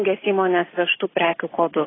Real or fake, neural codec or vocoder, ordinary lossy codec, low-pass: real; none; AAC, 16 kbps; 7.2 kHz